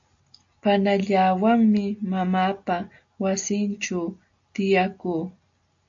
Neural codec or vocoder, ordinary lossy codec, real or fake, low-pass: none; AAC, 64 kbps; real; 7.2 kHz